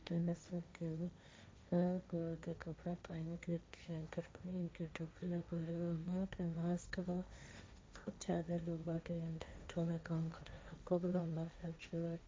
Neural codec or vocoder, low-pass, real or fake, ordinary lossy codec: codec, 16 kHz, 1.1 kbps, Voila-Tokenizer; none; fake; none